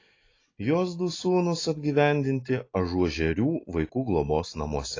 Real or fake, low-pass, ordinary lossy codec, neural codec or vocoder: real; 7.2 kHz; AAC, 32 kbps; none